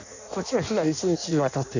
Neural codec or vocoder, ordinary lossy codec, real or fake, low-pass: codec, 16 kHz in and 24 kHz out, 0.6 kbps, FireRedTTS-2 codec; none; fake; 7.2 kHz